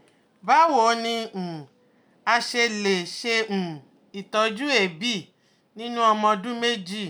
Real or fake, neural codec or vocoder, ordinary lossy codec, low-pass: real; none; none; none